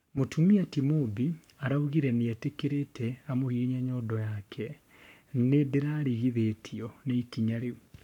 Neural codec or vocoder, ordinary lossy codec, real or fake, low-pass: codec, 44.1 kHz, 7.8 kbps, Pupu-Codec; none; fake; 19.8 kHz